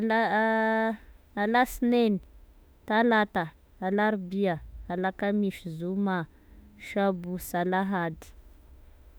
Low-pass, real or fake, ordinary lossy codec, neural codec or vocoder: none; fake; none; autoencoder, 48 kHz, 32 numbers a frame, DAC-VAE, trained on Japanese speech